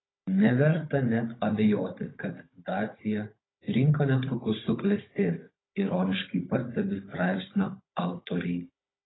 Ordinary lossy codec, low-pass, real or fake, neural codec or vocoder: AAC, 16 kbps; 7.2 kHz; fake; codec, 16 kHz, 16 kbps, FunCodec, trained on Chinese and English, 50 frames a second